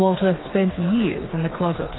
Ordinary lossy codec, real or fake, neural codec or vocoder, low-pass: AAC, 16 kbps; fake; codec, 16 kHz, 4 kbps, FreqCodec, smaller model; 7.2 kHz